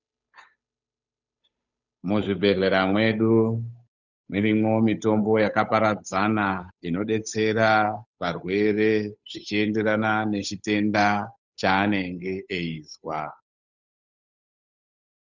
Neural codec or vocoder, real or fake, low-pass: codec, 16 kHz, 8 kbps, FunCodec, trained on Chinese and English, 25 frames a second; fake; 7.2 kHz